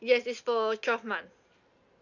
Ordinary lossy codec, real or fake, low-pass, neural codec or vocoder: none; real; 7.2 kHz; none